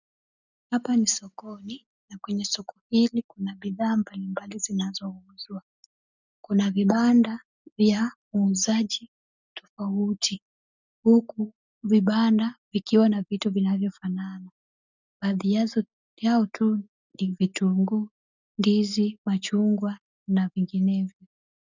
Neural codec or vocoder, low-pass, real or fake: none; 7.2 kHz; real